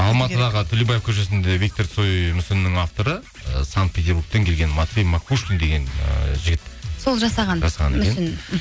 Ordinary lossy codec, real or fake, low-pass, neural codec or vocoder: none; real; none; none